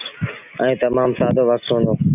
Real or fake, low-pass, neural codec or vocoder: real; 3.6 kHz; none